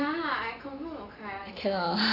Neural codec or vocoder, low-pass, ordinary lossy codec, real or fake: none; 5.4 kHz; AAC, 32 kbps; real